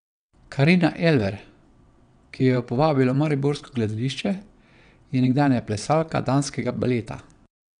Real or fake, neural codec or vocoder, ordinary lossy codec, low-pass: fake; vocoder, 22.05 kHz, 80 mel bands, WaveNeXt; none; 9.9 kHz